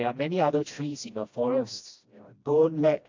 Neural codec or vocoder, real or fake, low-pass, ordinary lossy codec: codec, 16 kHz, 1 kbps, FreqCodec, smaller model; fake; 7.2 kHz; AAC, 48 kbps